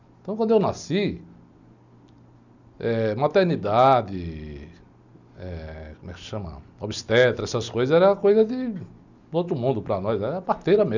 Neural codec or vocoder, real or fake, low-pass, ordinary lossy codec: none; real; 7.2 kHz; none